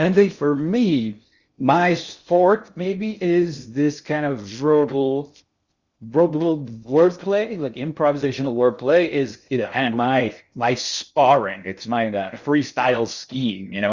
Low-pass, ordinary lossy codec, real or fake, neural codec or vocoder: 7.2 kHz; Opus, 64 kbps; fake; codec, 16 kHz in and 24 kHz out, 0.6 kbps, FocalCodec, streaming, 2048 codes